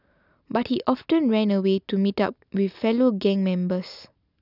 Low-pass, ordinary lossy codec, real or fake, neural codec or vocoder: 5.4 kHz; none; real; none